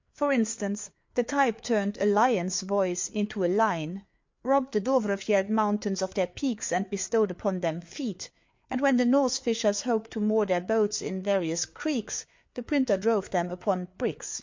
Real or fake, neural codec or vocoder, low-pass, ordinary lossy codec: fake; codec, 16 kHz, 4 kbps, FreqCodec, larger model; 7.2 kHz; MP3, 48 kbps